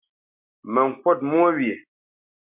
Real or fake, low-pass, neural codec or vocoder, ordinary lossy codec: real; 3.6 kHz; none; MP3, 32 kbps